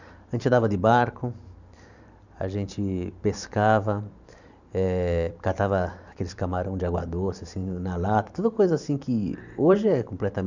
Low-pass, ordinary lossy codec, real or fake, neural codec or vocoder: 7.2 kHz; none; fake; vocoder, 44.1 kHz, 128 mel bands every 512 samples, BigVGAN v2